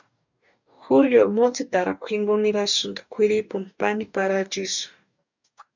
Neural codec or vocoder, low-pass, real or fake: codec, 44.1 kHz, 2.6 kbps, DAC; 7.2 kHz; fake